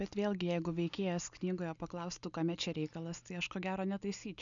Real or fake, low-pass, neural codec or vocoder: real; 7.2 kHz; none